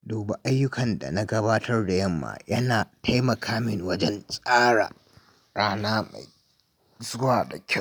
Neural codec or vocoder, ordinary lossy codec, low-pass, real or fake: none; none; none; real